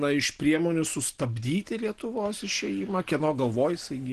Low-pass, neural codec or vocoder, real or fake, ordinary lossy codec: 10.8 kHz; none; real; Opus, 16 kbps